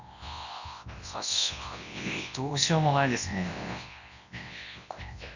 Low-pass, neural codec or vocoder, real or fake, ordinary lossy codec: 7.2 kHz; codec, 24 kHz, 0.9 kbps, WavTokenizer, large speech release; fake; none